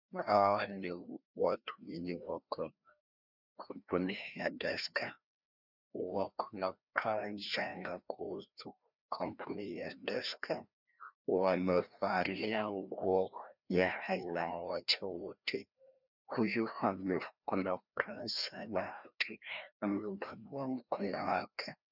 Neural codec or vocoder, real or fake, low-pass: codec, 16 kHz, 1 kbps, FreqCodec, larger model; fake; 5.4 kHz